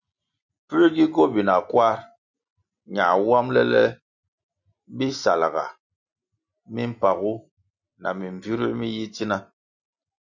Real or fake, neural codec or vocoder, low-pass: real; none; 7.2 kHz